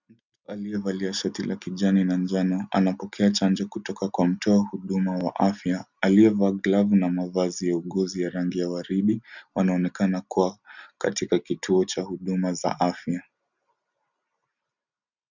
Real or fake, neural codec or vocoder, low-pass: real; none; 7.2 kHz